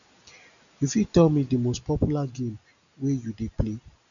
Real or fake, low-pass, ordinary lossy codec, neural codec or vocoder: real; 7.2 kHz; none; none